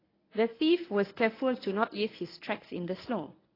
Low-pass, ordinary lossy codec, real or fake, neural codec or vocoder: 5.4 kHz; AAC, 24 kbps; fake; codec, 24 kHz, 0.9 kbps, WavTokenizer, medium speech release version 1